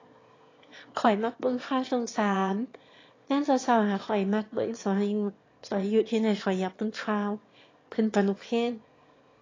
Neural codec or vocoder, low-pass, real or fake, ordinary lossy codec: autoencoder, 22.05 kHz, a latent of 192 numbers a frame, VITS, trained on one speaker; 7.2 kHz; fake; AAC, 32 kbps